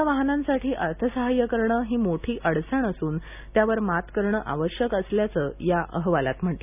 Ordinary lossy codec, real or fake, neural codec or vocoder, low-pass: none; real; none; 3.6 kHz